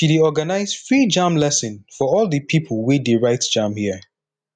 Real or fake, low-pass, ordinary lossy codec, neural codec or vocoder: real; 9.9 kHz; none; none